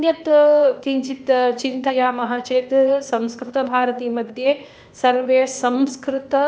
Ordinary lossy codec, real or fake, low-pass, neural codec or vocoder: none; fake; none; codec, 16 kHz, 0.8 kbps, ZipCodec